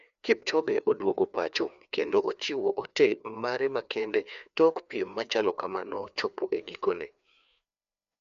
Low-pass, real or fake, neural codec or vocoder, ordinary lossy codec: 7.2 kHz; fake; codec, 16 kHz, 2 kbps, FreqCodec, larger model; MP3, 96 kbps